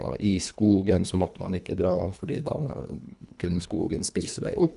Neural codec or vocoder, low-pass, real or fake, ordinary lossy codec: codec, 24 kHz, 1.5 kbps, HILCodec; 10.8 kHz; fake; none